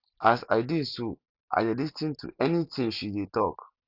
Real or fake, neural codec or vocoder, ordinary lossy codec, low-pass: real; none; Opus, 64 kbps; 5.4 kHz